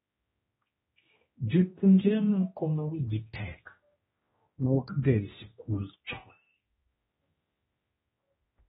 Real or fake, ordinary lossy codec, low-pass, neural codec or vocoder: fake; AAC, 16 kbps; 7.2 kHz; codec, 16 kHz, 1 kbps, X-Codec, HuBERT features, trained on general audio